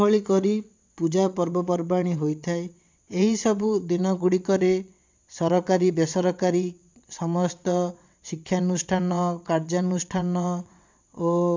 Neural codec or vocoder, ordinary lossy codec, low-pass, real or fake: vocoder, 22.05 kHz, 80 mel bands, WaveNeXt; MP3, 64 kbps; 7.2 kHz; fake